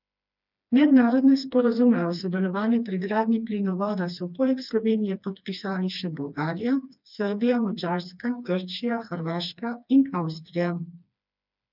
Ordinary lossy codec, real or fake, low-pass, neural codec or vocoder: none; fake; 5.4 kHz; codec, 16 kHz, 2 kbps, FreqCodec, smaller model